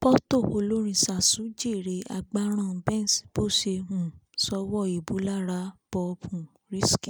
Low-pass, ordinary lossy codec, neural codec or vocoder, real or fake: none; none; none; real